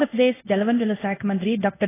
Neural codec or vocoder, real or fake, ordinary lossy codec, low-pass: codec, 16 kHz in and 24 kHz out, 1 kbps, XY-Tokenizer; fake; AAC, 16 kbps; 3.6 kHz